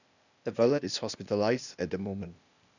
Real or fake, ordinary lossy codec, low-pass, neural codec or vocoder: fake; none; 7.2 kHz; codec, 16 kHz, 0.8 kbps, ZipCodec